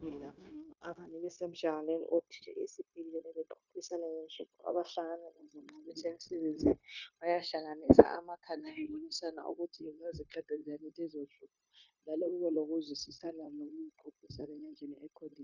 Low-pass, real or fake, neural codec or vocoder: 7.2 kHz; fake; codec, 16 kHz, 0.9 kbps, LongCat-Audio-Codec